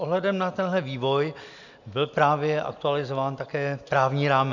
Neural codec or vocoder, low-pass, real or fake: none; 7.2 kHz; real